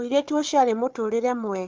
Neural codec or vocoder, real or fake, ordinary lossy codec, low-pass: codec, 16 kHz, 4 kbps, FunCodec, trained on Chinese and English, 50 frames a second; fake; Opus, 32 kbps; 7.2 kHz